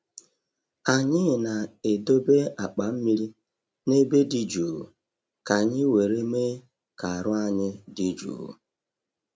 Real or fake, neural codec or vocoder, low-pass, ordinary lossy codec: real; none; none; none